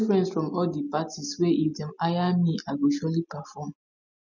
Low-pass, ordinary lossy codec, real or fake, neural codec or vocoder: 7.2 kHz; none; real; none